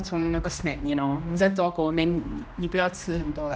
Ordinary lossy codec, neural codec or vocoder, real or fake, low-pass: none; codec, 16 kHz, 1 kbps, X-Codec, HuBERT features, trained on general audio; fake; none